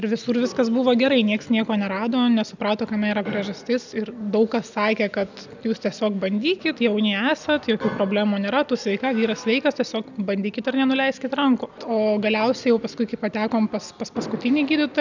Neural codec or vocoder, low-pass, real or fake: none; 7.2 kHz; real